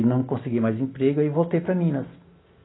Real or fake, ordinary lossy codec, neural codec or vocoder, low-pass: real; AAC, 16 kbps; none; 7.2 kHz